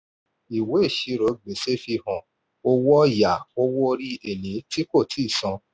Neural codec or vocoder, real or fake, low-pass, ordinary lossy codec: none; real; none; none